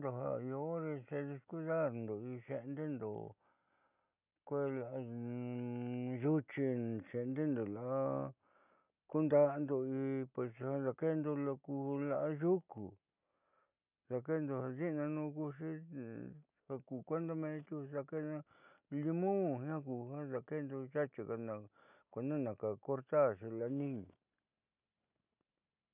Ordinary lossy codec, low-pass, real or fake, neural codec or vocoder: none; 3.6 kHz; real; none